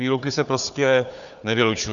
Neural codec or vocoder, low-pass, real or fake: codec, 16 kHz, 4 kbps, FunCodec, trained on Chinese and English, 50 frames a second; 7.2 kHz; fake